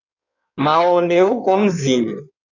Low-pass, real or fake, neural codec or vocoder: 7.2 kHz; fake; codec, 16 kHz in and 24 kHz out, 1.1 kbps, FireRedTTS-2 codec